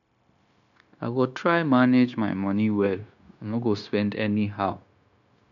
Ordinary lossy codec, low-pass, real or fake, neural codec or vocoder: none; 7.2 kHz; fake; codec, 16 kHz, 0.9 kbps, LongCat-Audio-Codec